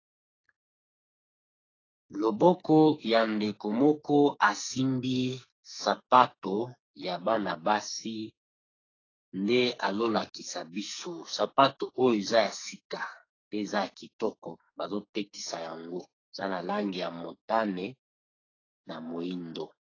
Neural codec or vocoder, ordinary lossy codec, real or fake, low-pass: codec, 44.1 kHz, 2.6 kbps, SNAC; AAC, 32 kbps; fake; 7.2 kHz